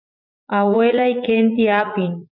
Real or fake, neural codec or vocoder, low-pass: fake; vocoder, 44.1 kHz, 80 mel bands, Vocos; 5.4 kHz